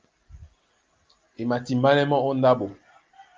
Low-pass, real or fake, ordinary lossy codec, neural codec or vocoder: 7.2 kHz; real; Opus, 24 kbps; none